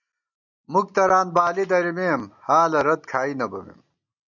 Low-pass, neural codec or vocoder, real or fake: 7.2 kHz; none; real